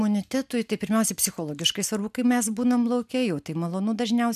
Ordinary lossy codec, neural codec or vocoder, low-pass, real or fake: MP3, 96 kbps; none; 14.4 kHz; real